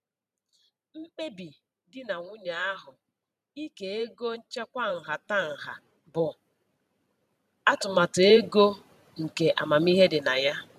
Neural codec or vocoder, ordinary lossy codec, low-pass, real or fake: vocoder, 44.1 kHz, 128 mel bands every 512 samples, BigVGAN v2; none; 14.4 kHz; fake